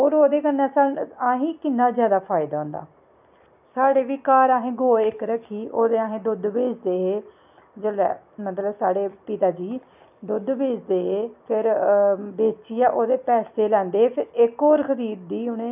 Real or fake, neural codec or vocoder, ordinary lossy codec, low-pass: real; none; none; 3.6 kHz